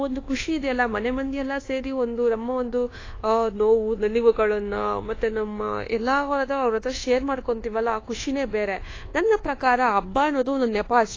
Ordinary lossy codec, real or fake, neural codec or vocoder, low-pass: AAC, 32 kbps; fake; codec, 24 kHz, 1.2 kbps, DualCodec; 7.2 kHz